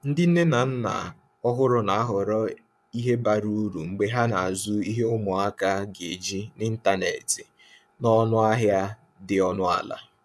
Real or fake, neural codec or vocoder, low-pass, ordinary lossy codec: fake; vocoder, 24 kHz, 100 mel bands, Vocos; none; none